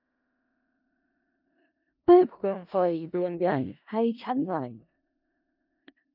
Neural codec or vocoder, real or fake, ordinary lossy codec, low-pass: codec, 16 kHz in and 24 kHz out, 0.4 kbps, LongCat-Audio-Codec, four codebook decoder; fake; none; 5.4 kHz